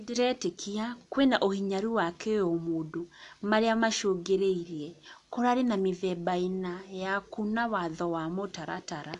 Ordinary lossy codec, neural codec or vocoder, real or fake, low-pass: AAC, 64 kbps; none; real; 10.8 kHz